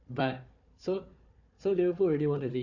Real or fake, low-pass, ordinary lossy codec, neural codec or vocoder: fake; 7.2 kHz; none; codec, 16 kHz, 4 kbps, FunCodec, trained on Chinese and English, 50 frames a second